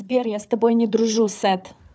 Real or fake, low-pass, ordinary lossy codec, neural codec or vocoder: fake; none; none; codec, 16 kHz, 4 kbps, FunCodec, trained on Chinese and English, 50 frames a second